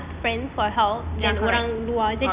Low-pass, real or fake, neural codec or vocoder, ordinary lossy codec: 3.6 kHz; real; none; Opus, 64 kbps